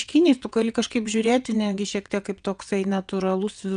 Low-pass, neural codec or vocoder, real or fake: 9.9 kHz; vocoder, 22.05 kHz, 80 mel bands, WaveNeXt; fake